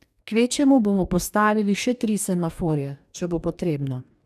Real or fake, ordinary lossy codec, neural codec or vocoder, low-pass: fake; none; codec, 44.1 kHz, 2.6 kbps, DAC; 14.4 kHz